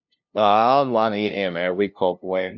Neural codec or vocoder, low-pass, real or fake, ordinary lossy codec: codec, 16 kHz, 0.5 kbps, FunCodec, trained on LibriTTS, 25 frames a second; 7.2 kHz; fake; none